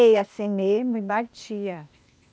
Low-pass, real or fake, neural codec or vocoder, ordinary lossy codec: none; fake; codec, 16 kHz, 0.8 kbps, ZipCodec; none